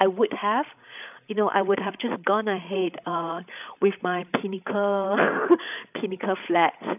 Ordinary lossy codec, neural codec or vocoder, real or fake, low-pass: none; codec, 16 kHz, 8 kbps, FreqCodec, larger model; fake; 3.6 kHz